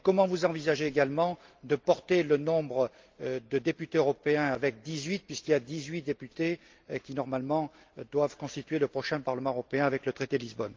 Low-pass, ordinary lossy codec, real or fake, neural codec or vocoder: 7.2 kHz; Opus, 32 kbps; real; none